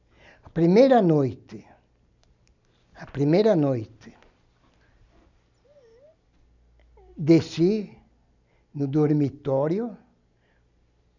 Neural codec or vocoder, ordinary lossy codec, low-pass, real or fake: none; none; 7.2 kHz; real